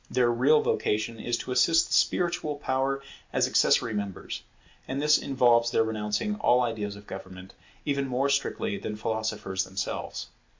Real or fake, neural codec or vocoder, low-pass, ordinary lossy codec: real; none; 7.2 kHz; MP3, 48 kbps